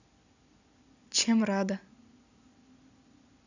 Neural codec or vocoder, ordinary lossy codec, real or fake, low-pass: none; none; real; 7.2 kHz